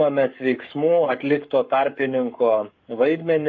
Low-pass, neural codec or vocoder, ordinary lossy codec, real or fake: 7.2 kHz; codec, 44.1 kHz, 7.8 kbps, Pupu-Codec; MP3, 48 kbps; fake